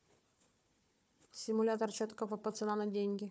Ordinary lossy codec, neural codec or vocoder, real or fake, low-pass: none; codec, 16 kHz, 4 kbps, FunCodec, trained on Chinese and English, 50 frames a second; fake; none